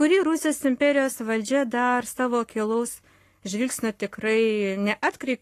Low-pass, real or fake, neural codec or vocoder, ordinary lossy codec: 14.4 kHz; fake; codec, 44.1 kHz, 7.8 kbps, Pupu-Codec; AAC, 48 kbps